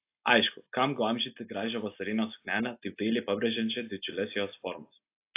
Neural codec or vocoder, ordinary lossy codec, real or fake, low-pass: none; AAC, 24 kbps; real; 3.6 kHz